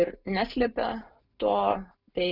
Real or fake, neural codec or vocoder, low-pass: real; none; 5.4 kHz